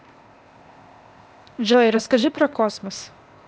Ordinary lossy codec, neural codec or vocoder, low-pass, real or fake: none; codec, 16 kHz, 0.8 kbps, ZipCodec; none; fake